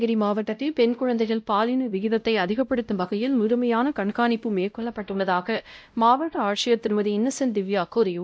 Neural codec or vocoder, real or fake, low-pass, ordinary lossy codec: codec, 16 kHz, 0.5 kbps, X-Codec, WavLM features, trained on Multilingual LibriSpeech; fake; none; none